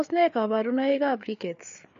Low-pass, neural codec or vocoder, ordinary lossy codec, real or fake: 7.2 kHz; codec, 16 kHz, 4 kbps, FreqCodec, larger model; MP3, 48 kbps; fake